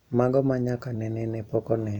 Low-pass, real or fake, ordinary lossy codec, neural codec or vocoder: 19.8 kHz; real; none; none